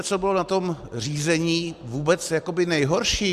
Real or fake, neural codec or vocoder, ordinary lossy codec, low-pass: real; none; Opus, 64 kbps; 14.4 kHz